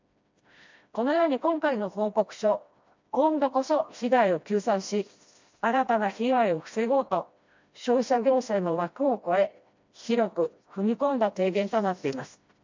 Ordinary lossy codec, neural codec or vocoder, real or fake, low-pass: MP3, 48 kbps; codec, 16 kHz, 1 kbps, FreqCodec, smaller model; fake; 7.2 kHz